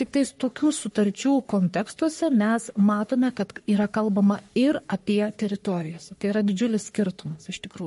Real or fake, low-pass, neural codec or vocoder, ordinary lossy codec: fake; 14.4 kHz; codec, 44.1 kHz, 3.4 kbps, Pupu-Codec; MP3, 48 kbps